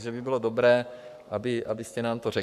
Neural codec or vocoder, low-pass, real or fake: codec, 44.1 kHz, 7.8 kbps, Pupu-Codec; 14.4 kHz; fake